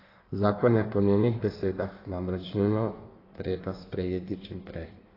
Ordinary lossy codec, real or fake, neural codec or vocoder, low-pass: AAC, 24 kbps; fake; codec, 16 kHz in and 24 kHz out, 1.1 kbps, FireRedTTS-2 codec; 5.4 kHz